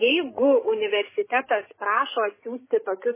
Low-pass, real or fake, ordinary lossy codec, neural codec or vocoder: 3.6 kHz; fake; MP3, 16 kbps; codec, 16 kHz, 16 kbps, FreqCodec, larger model